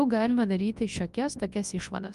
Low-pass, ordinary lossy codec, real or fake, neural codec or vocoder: 10.8 kHz; Opus, 32 kbps; fake; codec, 24 kHz, 0.9 kbps, WavTokenizer, large speech release